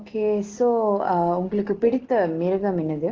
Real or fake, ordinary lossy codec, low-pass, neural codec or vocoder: real; Opus, 16 kbps; 7.2 kHz; none